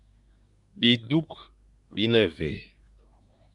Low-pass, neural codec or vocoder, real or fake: 10.8 kHz; codec, 24 kHz, 1 kbps, SNAC; fake